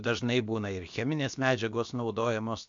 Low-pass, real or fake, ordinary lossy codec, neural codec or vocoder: 7.2 kHz; fake; MP3, 64 kbps; codec, 16 kHz, about 1 kbps, DyCAST, with the encoder's durations